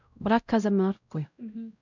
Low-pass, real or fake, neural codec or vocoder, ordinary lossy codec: 7.2 kHz; fake; codec, 16 kHz, 0.5 kbps, X-Codec, WavLM features, trained on Multilingual LibriSpeech; none